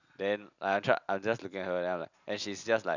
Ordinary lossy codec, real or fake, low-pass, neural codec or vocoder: none; real; 7.2 kHz; none